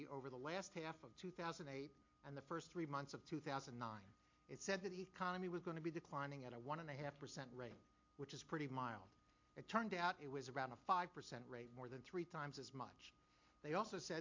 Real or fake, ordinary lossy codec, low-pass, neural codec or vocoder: real; MP3, 48 kbps; 7.2 kHz; none